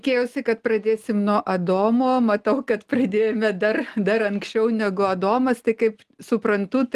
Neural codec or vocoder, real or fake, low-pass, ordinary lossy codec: none; real; 14.4 kHz; Opus, 24 kbps